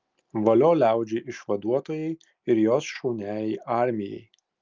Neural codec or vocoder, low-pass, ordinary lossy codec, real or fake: none; 7.2 kHz; Opus, 24 kbps; real